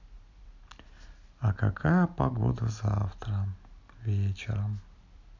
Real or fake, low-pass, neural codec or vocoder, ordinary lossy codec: real; 7.2 kHz; none; none